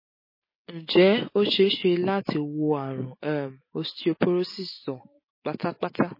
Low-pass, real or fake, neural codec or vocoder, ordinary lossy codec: 5.4 kHz; real; none; MP3, 24 kbps